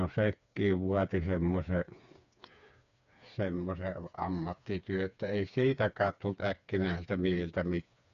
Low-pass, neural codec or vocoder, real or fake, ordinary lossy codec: 7.2 kHz; codec, 16 kHz, 4 kbps, FreqCodec, smaller model; fake; none